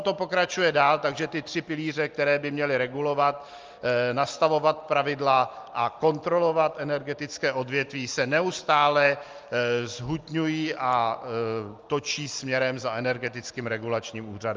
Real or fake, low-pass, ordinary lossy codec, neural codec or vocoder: real; 7.2 kHz; Opus, 24 kbps; none